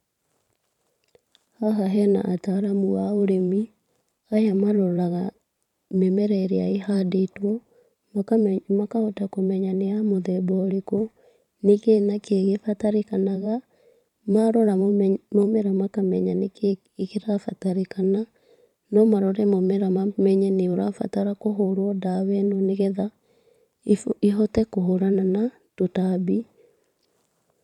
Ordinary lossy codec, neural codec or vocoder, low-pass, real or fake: none; vocoder, 44.1 kHz, 128 mel bands every 512 samples, BigVGAN v2; 19.8 kHz; fake